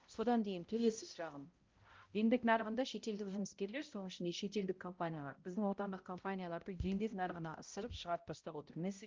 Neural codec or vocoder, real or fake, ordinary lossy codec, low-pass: codec, 16 kHz, 0.5 kbps, X-Codec, HuBERT features, trained on balanced general audio; fake; Opus, 32 kbps; 7.2 kHz